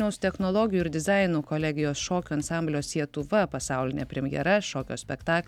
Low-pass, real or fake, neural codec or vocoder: 19.8 kHz; real; none